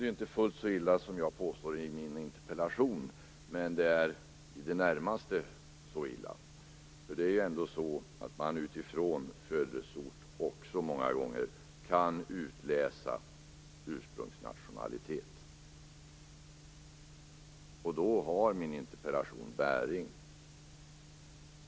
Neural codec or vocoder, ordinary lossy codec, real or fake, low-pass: none; none; real; none